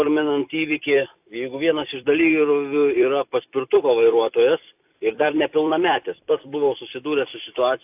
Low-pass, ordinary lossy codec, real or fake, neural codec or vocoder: 3.6 kHz; AAC, 32 kbps; fake; vocoder, 44.1 kHz, 128 mel bands every 256 samples, BigVGAN v2